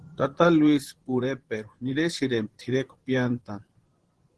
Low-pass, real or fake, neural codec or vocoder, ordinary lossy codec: 10.8 kHz; fake; vocoder, 24 kHz, 100 mel bands, Vocos; Opus, 16 kbps